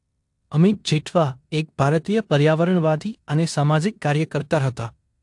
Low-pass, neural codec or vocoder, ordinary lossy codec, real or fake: 10.8 kHz; codec, 16 kHz in and 24 kHz out, 0.9 kbps, LongCat-Audio-Codec, four codebook decoder; AAC, 64 kbps; fake